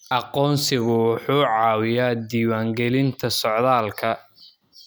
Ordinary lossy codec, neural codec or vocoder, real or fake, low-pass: none; none; real; none